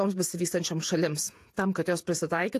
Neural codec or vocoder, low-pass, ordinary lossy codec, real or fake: vocoder, 48 kHz, 128 mel bands, Vocos; 14.4 kHz; AAC, 64 kbps; fake